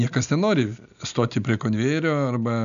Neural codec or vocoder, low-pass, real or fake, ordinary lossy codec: none; 7.2 kHz; real; MP3, 96 kbps